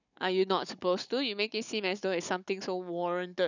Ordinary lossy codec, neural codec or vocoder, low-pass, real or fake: none; codec, 16 kHz, 16 kbps, FunCodec, trained on Chinese and English, 50 frames a second; 7.2 kHz; fake